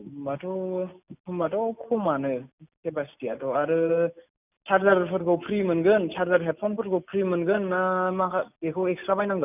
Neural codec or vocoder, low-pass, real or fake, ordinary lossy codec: none; 3.6 kHz; real; none